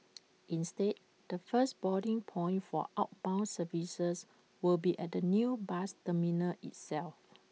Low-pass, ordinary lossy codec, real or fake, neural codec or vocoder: none; none; real; none